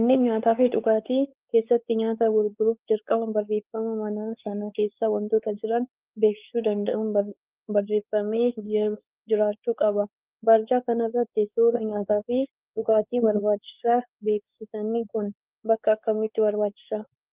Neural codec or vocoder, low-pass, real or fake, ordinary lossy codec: codec, 16 kHz, 4 kbps, X-Codec, WavLM features, trained on Multilingual LibriSpeech; 3.6 kHz; fake; Opus, 24 kbps